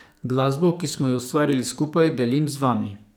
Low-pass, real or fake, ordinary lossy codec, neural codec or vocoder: none; fake; none; codec, 44.1 kHz, 2.6 kbps, SNAC